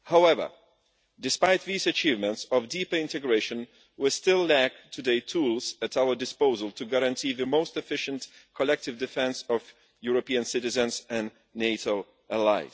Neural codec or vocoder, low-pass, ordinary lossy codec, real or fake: none; none; none; real